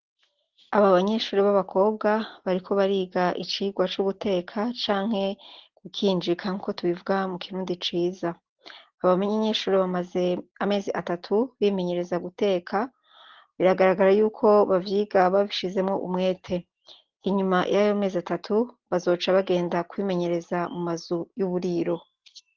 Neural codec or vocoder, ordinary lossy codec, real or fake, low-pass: none; Opus, 16 kbps; real; 7.2 kHz